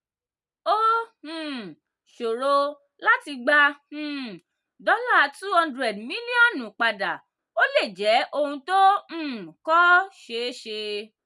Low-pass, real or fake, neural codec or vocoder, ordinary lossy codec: none; real; none; none